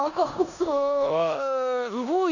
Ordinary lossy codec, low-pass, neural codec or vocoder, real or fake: none; 7.2 kHz; codec, 16 kHz in and 24 kHz out, 0.9 kbps, LongCat-Audio-Codec, four codebook decoder; fake